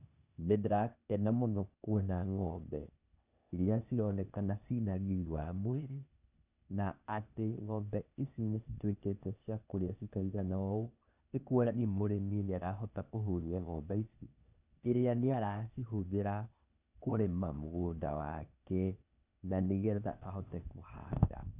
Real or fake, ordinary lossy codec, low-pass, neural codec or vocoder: fake; none; 3.6 kHz; codec, 16 kHz, 0.8 kbps, ZipCodec